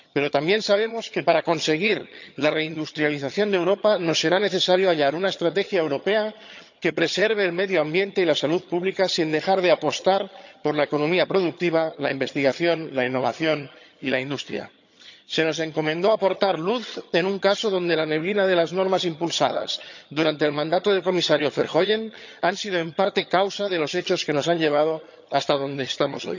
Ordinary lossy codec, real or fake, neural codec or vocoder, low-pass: none; fake; vocoder, 22.05 kHz, 80 mel bands, HiFi-GAN; 7.2 kHz